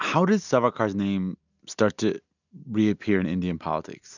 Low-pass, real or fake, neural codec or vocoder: 7.2 kHz; real; none